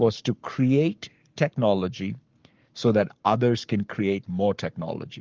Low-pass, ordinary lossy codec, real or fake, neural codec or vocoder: 7.2 kHz; Opus, 24 kbps; fake; codec, 44.1 kHz, 7.8 kbps, Pupu-Codec